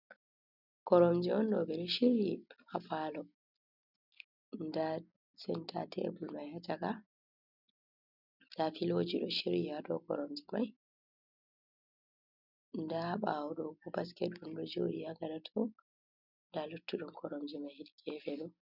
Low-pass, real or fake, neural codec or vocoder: 5.4 kHz; real; none